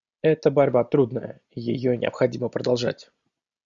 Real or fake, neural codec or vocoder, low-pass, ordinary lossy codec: real; none; 7.2 kHz; Opus, 64 kbps